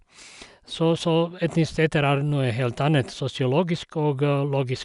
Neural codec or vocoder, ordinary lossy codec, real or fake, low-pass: none; none; real; 10.8 kHz